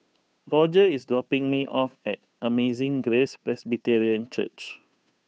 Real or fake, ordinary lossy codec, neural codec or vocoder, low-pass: fake; none; codec, 16 kHz, 2 kbps, FunCodec, trained on Chinese and English, 25 frames a second; none